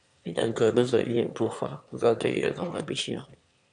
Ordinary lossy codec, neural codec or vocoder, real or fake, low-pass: AAC, 64 kbps; autoencoder, 22.05 kHz, a latent of 192 numbers a frame, VITS, trained on one speaker; fake; 9.9 kHz